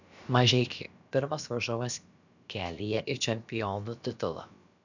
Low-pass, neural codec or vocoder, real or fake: 7.2 kHz; codec, 16 kHz, about 1 kbps, DyCAST, with the encoder's durations; fake